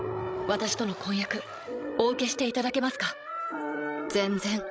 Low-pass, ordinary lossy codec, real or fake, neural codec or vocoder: none; none; fake; codec, 16 kHz, 16 kbps, FreqCodec, larger model